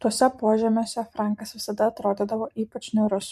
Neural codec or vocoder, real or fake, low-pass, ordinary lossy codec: vocoder, 44.1 kHz, 128 mel bands every 512 samples, BigVGAN v2; fake; 14.4 kHz; MP3, 64 kbps